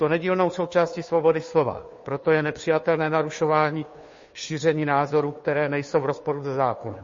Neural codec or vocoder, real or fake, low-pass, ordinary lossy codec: codec, 16 kHz, 2 kbps, FunCodec, trained on Chinese and English, 25 frames a second; fake; 7.2 kHz; MP3, 32 kbps